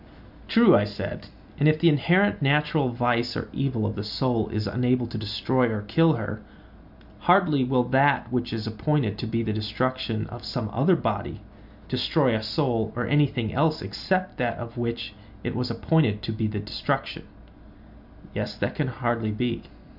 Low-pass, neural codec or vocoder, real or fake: 5.4 kHz; none; real